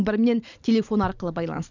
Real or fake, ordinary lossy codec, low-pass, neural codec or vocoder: real; none; 7.2 kHz; none